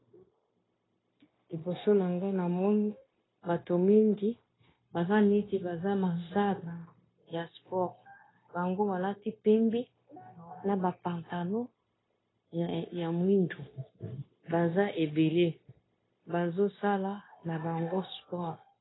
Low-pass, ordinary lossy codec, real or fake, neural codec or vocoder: 7.2 kHz; AAC, 16 kbps; fake; codec, 16 kHz, 0.9 kbps, LongCat-Audio-Codec